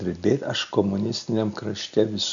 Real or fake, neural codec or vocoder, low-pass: real; none; 7.2 kHz